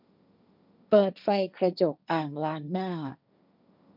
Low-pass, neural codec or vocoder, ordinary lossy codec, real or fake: 5.4 kHz; codec, 16 kHz, 1.1 kbps, Voila-Tokenizer; none; fake